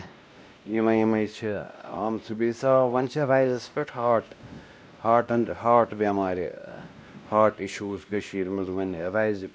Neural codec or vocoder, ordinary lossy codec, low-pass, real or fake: codec, 16 kHz, 1 kbps, X-Codec, WavLM features, trained on Multilingual LibriSpeech; none; none; fake